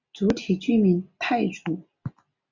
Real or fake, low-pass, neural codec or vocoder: real; 7.2 kHz; none